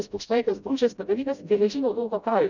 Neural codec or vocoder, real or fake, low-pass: codec, 16 kHz, 0.5 kbps, FreqCodec, smaller model; fake; 7.2 kHz